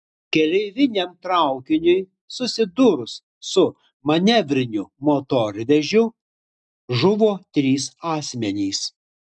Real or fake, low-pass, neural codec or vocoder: real; 10.8 kHz; none